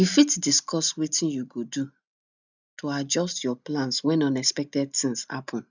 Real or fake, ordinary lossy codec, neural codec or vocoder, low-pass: real; none; none; 7.2 kHz